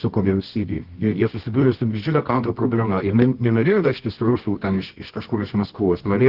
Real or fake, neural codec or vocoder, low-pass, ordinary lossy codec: fake; codec, 24 kHz, 0.9 kbps, WavTokenizer, medium music audio release; 5.4 kHz; Opus, 16 kbps